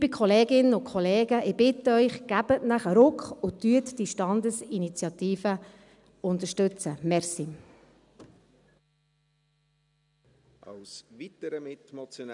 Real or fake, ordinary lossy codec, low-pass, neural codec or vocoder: real; none; 10.8 kHz; none